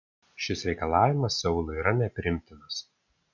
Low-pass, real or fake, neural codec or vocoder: 7.2 kHz; real; none